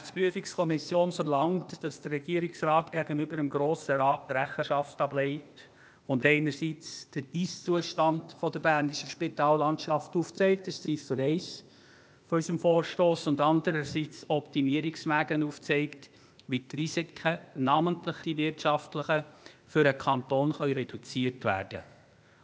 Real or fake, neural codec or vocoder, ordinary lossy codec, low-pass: fake; codec, 16 kHz, 0.8 kbps, ZipCodec; none; none